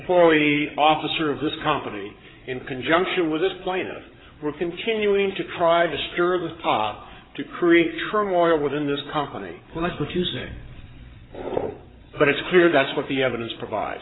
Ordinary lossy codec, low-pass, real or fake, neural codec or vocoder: AAC, 16 kbps; 7.2 kHz; fake; codec, 16 kHz, 8 kbps, FreqCodec, larger model